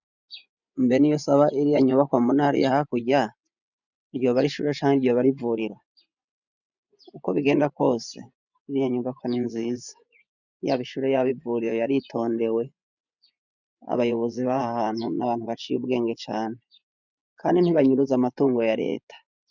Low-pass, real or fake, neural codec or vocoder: 7.2 kHz; fake; vocoder, 24 kHz, 100 mel bands, Vocos